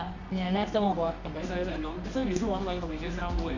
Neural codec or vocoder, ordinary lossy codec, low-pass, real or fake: codec, 24 kHz, 0.9 kbps, WavTokenizer, medium music audio release; none; 7.2 kHz; fake